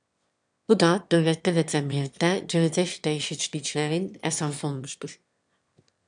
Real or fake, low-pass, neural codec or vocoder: fake; 9.9 kHz; autoencoder, 22.05 kHz, a latent of 192 numbers a frame, VITS, trained on one speaker